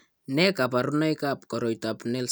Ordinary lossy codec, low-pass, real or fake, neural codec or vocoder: none; none; real; none